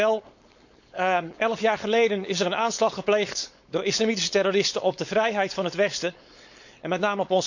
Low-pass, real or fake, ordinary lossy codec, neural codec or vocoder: 7.2 kHz; fake; none; codec, 16 kHz, 4.8 kbps, FACodec